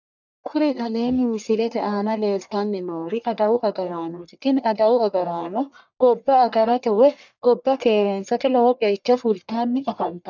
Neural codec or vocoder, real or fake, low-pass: codec, 44.1 kHz, 1.7 kbps, Pupu-Codec; fake; 7.2 kHz